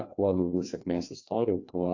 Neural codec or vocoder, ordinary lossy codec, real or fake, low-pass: codec, 16 kHz, 2 kbps, FreqCodec, larger model; AAC, 48 kbps; fake; 7.2 kHz